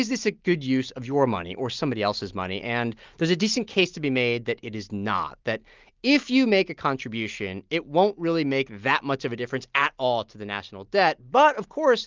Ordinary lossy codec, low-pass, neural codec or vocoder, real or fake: Opus, 24 kbps; 7.2 kHz; none; real